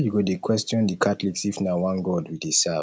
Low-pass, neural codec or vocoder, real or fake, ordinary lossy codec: none; none; real; none